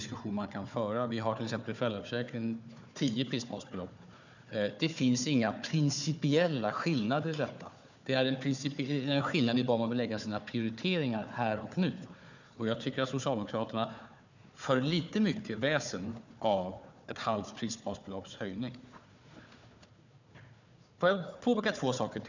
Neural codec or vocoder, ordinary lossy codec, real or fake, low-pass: codec, 16 kHz, 4 kbps, FunCodec, trained on Chinese and English, 50 frames a second; none; fake; 7.2 kHz